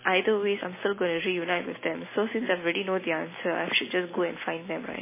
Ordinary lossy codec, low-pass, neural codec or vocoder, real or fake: MP3, 16 kbps; 3.6 kHz; none; real